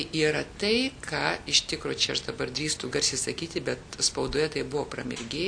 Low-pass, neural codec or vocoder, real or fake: 9.9 kHz; none; real